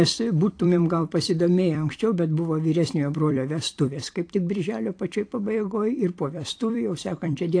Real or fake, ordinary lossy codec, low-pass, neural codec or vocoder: fake; AAC, 48 kbps; 9.9 kHz; vocoder, 44.1 kHz, 128 mel bands every 256 samples, BigVGAN v2